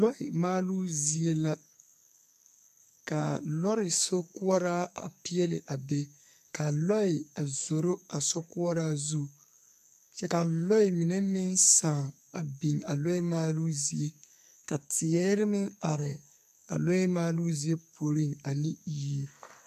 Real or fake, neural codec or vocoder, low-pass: fake; codec, 44.1 kHz, 2.6 kbps, SNAC; 14.4 kHz